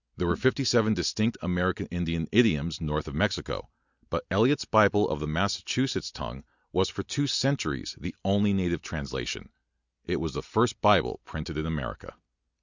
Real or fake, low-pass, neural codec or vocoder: real; 7.2 kHz; none